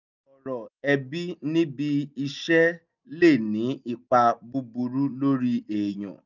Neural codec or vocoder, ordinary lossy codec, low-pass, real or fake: none; none; 7.2 kHz; real